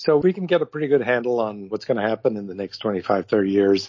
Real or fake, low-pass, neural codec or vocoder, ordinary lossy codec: real; 7.2 kHz; none; MP3, 32 kbps